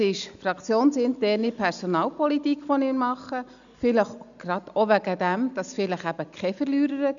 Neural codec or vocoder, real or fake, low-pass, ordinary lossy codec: none; real; 7.2 kHz; none